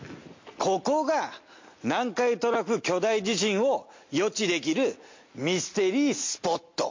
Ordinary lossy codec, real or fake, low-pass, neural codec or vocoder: MP3, 48 kbps; real; 7.2 kHz; none